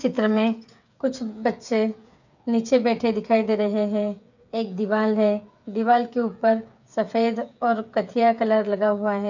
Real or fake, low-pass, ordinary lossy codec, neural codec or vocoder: fake; 7.2 kHz; none; codec, 16 kHz, 8 kbps, FreqCodec, smaller model